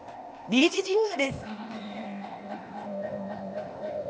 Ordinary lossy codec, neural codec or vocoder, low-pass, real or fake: none; codec, 16 kHz, 0.8 kbps, ZipCodec; none; fake